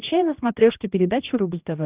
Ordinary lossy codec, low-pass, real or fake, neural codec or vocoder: Opus, 32 kbps; 3.6 kHz; fake; codec, 16 kHz, 1 kbps, X-Codec, HuBERT features, trained on general audio